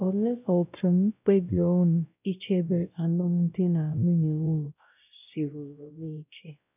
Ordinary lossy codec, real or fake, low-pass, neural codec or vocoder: none; fake; 3.6 kHz; codec, 16 kHz, 0.5 kbps, X-Codec, WavLM features, trained on Multilingual LibriSpeech